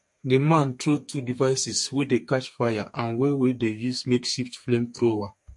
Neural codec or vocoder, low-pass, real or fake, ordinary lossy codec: codec, 32 kHz, 1.9 kbps, SNAC; 10.8 kHz; fake; MP3, 48 kbps